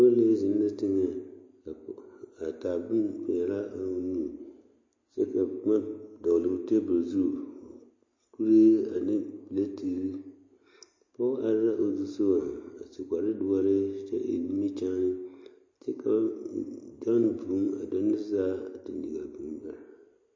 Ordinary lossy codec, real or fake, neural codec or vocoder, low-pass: MP3, 32 kbps; real; none; 7.2 kHz